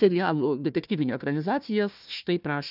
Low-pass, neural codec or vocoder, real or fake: 5.4 kHz; codec, 16 kHz, 1 kbps, FunCodec, trained on Chinese and English, 50 frames a second; fake